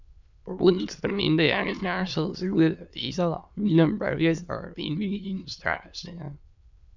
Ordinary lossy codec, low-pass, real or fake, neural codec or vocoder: none; 7.2 kHz; fake; autoencoder, 22.05 kHz, a latent of 192 numbers a frame, VITS, trained on many speakers